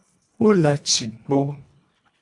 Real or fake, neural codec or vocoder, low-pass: fake; codec, 24 kHz, 1.5 kbps, HILCodec; 10.8 kHz